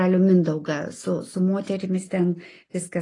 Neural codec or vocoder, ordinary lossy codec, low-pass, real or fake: none; AAC, 32 kbps; 10.8 kHz; real